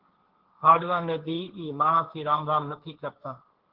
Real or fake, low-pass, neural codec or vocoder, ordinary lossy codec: fake; 5.4 kHz; codec, 16 kHz, 1.1 kbps, Voila-Tokenizer; Opus, 16 kbps